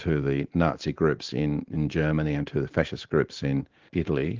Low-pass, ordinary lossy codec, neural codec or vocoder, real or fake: 7.2 kHz; Opus, 16 kbps; vocoder, 44.1 kHz, 128 mel bands every 512 samples, BigVGAN v2; fake